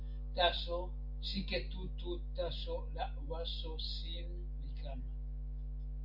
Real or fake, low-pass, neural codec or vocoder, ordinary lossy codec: real; 5.4 kHz; none; MP3, 48 kbps